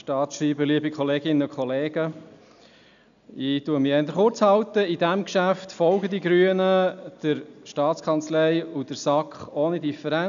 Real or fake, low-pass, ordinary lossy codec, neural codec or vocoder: real; 7.2 kHz; none; none